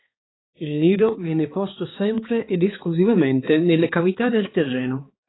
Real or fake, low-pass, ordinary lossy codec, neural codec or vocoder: fake; 7.2 kHz; AAC, 16 kbps; codec, 16 kHz, 4 kbps, X-Codec, HuBERT features, trained on balanced general audio